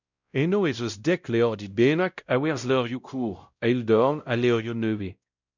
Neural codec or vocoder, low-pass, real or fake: codec, 16 kHz, 0.5 kbps, X-Codec, WavLM features, trained on Multilingual LibriSpeech; 7.2 kHz; fake